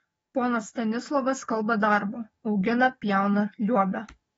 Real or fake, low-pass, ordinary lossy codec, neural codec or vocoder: fake; 19.8 kHz; AAC, 24 kbps; codec, 44.1 kHz, 7.8 kbps, DAC